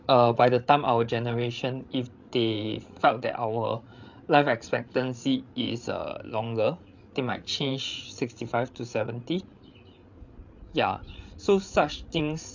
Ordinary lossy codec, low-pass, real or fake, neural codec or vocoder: MP3, 64 kbps; 7.2 kHz; fake; codec, 16 kHz, 8 kbps, FreqCodec, larger model